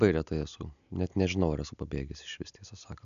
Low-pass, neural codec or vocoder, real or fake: 7.2 kHz; none; real